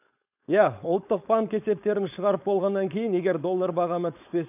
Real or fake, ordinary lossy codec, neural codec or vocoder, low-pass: fake; none; codec, 16 kHz, 4.8 kbps, FACodec; 3.6 kHz